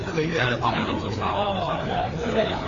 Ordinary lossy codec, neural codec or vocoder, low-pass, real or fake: AAC, 32 kbps; codec, 16 kHz, 4 kbps, FreqCodec, larger model; 7.2 kHz; fake